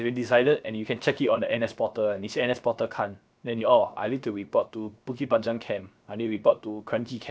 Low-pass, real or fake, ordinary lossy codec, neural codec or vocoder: none; fake; none; codec, 16 kHz, 0.7 kbps, FocalCodec